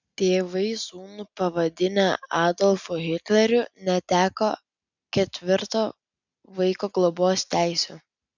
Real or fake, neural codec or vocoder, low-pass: real; none; 7.2 kHz